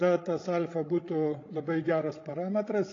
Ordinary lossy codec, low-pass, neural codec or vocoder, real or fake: AAC, 32 kbps; 7.2 kHz; codec, 16 kHz, 16 kbps, FreqCodec, larger model; fake